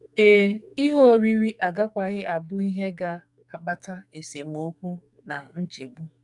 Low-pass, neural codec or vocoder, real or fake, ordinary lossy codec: 10.8 kHz; codec, 44.1 kHz, 2.6 kbps, SNAC; fake; none